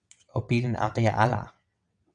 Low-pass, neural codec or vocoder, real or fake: 9.9 kHz; vocoder, 22.05 kHz, 80 mel bands, WaveNeXt; fake